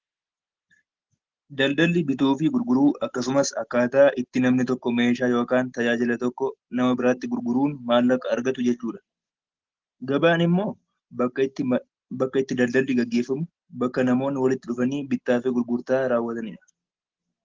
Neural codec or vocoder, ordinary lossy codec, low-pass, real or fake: none; Opus, 16 kbps; 7.2 kHz; real